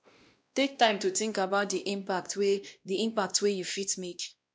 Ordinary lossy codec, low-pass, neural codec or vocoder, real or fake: none; none; codec, 16 kHz, 1 kbps, X-Codec, WavLM features, trained on Multilingual LibriSpeech; fake